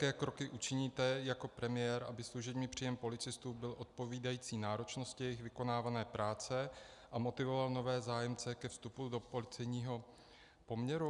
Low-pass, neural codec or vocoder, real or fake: 10.8 kHz; none; real